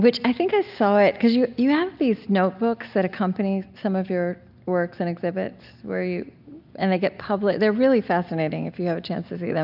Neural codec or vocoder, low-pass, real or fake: none; 5.4 kHz; real